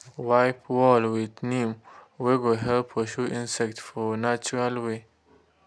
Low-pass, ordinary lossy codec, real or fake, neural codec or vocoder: none; none; real; none